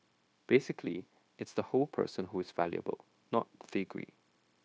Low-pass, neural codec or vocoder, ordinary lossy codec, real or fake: none; codec, 16 kHz, 0.9 kbps, LongCat-Audio-Codec; none; fake